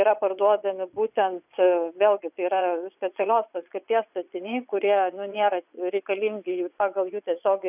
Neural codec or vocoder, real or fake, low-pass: vocoder, 22.05 kHz, 80 mel bands, Vocos; fake; 3.6 kHz